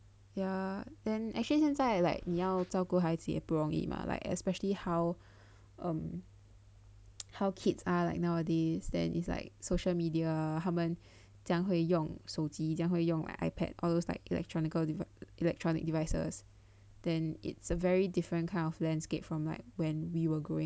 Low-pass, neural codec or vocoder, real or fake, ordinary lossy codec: none; none; real; none